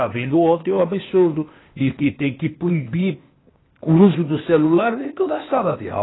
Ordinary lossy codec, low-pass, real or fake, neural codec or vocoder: AAC, 16 kbps; 7.2 kHz; fake; codec, 16 kHz, 0.8 kbps, ZipCodec